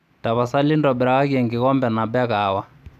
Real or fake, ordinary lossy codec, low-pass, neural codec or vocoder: real; none; 14.4 kHz; none